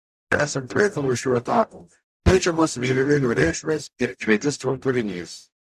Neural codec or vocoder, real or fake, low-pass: codec, 44.1 kHz, 0.9 kbps, DAC; fake; 14.4 kHz